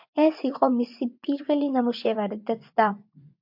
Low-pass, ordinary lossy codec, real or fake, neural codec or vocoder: 5.4 kHz; MP3, 48 kbps; fake; vocoder, 24 kHz, 100 mel bands, Vocos